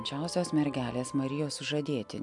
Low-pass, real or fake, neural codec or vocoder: 10.8 kHz; real; none